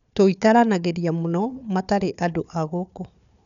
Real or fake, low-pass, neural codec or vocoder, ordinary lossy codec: fake; 7.2 kHz; codec, 16 kHz, 4 kbps, FunCodec, trained on Chinese and English, 50 frames a second; none